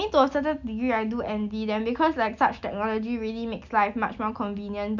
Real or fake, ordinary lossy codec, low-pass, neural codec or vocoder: real; none; 7.2 kHz; none